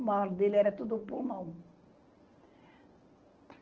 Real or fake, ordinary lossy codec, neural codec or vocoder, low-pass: real; Opus, 16 kbps; none; 7.2 kHz